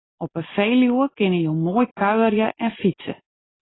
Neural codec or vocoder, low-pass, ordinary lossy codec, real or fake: none; 7.2 kHz; AAC, 16 kbps; real